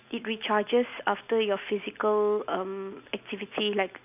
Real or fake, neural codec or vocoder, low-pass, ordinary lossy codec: real; none; 3.6 kHz; none